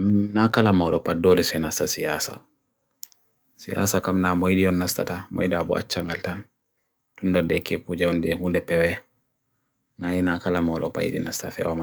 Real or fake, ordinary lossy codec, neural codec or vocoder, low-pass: fake; none; codec, 44.1 kHz, 7.8 kbps, DAC; none